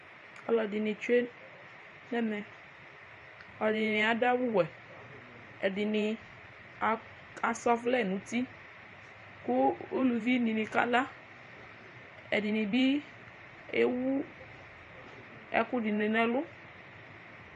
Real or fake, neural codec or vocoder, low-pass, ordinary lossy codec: fake; vocoder, 44.1 kHz, 128 mel bands every 512 samples, BigVGAN v2; 14.4 kHz; MP3, 48 kbps